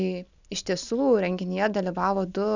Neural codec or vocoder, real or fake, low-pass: none; real; 7.2 kHz